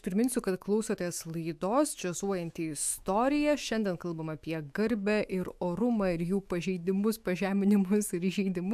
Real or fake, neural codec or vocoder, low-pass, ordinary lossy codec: fake; autoencoder, 48 kHz, 128 numbers a frame, DAC-VAE, trained on Japanese speech; 14.4 kHz; AAC, 96 kbps